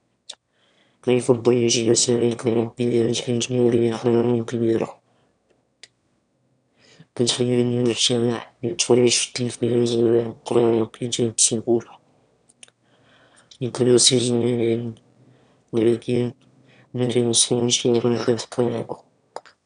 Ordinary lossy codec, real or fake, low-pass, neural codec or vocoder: none; fake; 9.9 kHz; autoencoder, 22.05 kHz, a latent of 192 numbers a frame, VITS, trained on one speaker